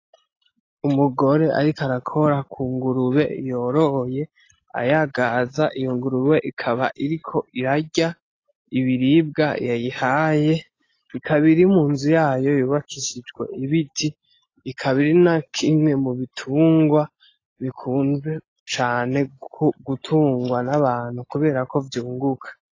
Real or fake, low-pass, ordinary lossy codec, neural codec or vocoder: real; 7.2 kHz; AAC, 32 kbps; none